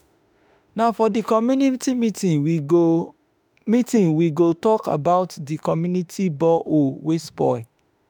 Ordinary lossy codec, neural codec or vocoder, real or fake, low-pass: none; autoencoder, 48 kHz, 32 numbers a frame, DAC-VAE, trained on Japanese speech; fake; none